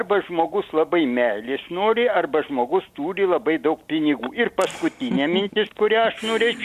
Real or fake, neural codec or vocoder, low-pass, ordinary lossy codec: real; none; 14.4 kHz; Opus, 64 kbps